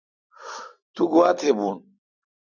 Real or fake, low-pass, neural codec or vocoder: real; 7.2 kHz; none